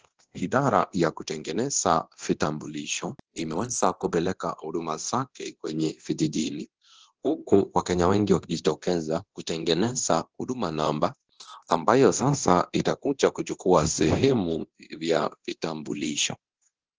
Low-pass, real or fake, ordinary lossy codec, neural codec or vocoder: 7.2 kHz; fake; Opus, 16 kbps; codec, 24 kHz, 0.9 kbps, DualCodec